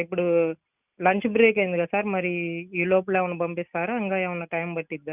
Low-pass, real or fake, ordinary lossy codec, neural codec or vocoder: 3.6 kHz; real; none; none